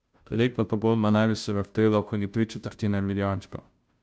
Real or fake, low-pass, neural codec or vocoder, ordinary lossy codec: fake; none; codec, 16 kHz, 0.5 kbps, FunCodec, trained on Chinese and English, 25 frames a second; none